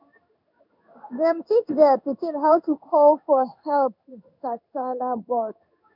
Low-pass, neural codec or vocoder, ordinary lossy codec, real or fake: 5.4 kHz; codec, 16 kHz in and 24 kHz out, 1 kbps, XY-Tokenizer; none; fake